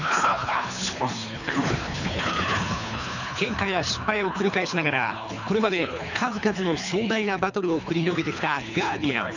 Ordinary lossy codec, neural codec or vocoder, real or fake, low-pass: none; codec, 24 kHz, 3 kbps, HILCodec; fake; 7.2 kHz